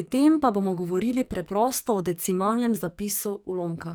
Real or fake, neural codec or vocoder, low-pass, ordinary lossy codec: fake; codec, 44.1 kHz, 2.6 kbps, SNAC; none; none